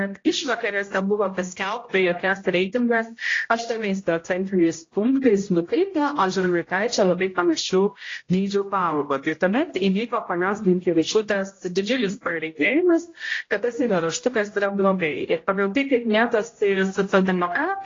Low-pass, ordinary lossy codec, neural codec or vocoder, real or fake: 7.2 kHz; AAC, 32 kbps; codec, 16 kHz, 0.5 kbps, X-Codec, HuBERT features, trained on general audio; fake